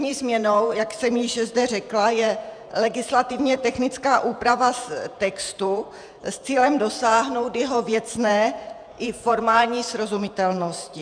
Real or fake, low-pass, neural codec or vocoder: fake; 9.9 kHz; vocoder, 48 kHz, 128 mel bands, Vocos